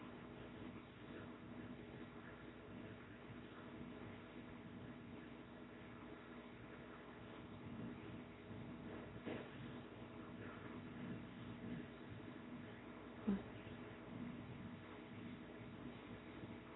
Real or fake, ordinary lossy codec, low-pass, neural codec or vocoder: fake; AAC, 16 kbps; 7.2 kHz; codec, 24 kHz, 0.9 kbps, WavTokenizer, small release